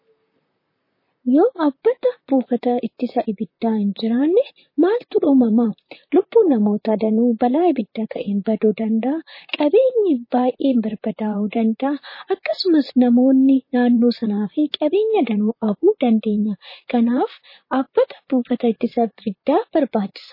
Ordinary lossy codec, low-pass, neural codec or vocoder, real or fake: MP3, 24 kbps; 5.4 kHz; vocoder, 44.1 kHz, 128 mel bands, Pupu-Vocoder; fake